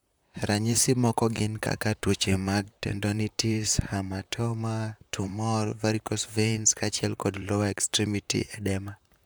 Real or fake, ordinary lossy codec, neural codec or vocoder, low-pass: fake; none; vocoder, 44.1 kHz, 128 mel bands, Pupu-Vocoder; none